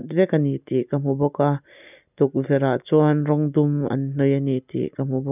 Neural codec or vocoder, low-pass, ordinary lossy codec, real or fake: none; 3.6 kHz; none; real